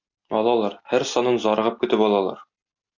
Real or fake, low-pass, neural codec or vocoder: real; 7.2 kHz; none